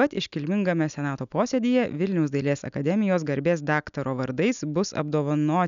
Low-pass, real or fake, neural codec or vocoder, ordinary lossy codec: 7.2 kHz; real; none; MP3, 96 kbps